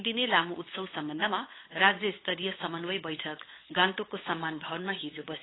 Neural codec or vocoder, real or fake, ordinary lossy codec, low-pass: codec, 16 kHz, 8 kbps, FunCodec, trained on LibriTTS, 25 frames a second; fake; AAC, 16 kbps; 7.2 kHz